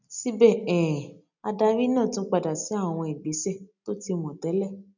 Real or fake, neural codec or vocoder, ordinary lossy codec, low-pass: real; none; none; 7.2 kHz